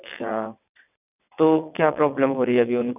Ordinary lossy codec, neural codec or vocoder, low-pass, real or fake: none; vocoder, 22.05 kHz, 80 mel bands, WaveNeXt; 3.6 kHz; fake